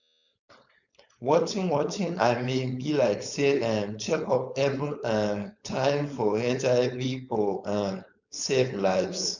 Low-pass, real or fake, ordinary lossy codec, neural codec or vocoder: 7.2 kHz; fake; none; codec, 16 kHz, 4.8 kbps, FACodec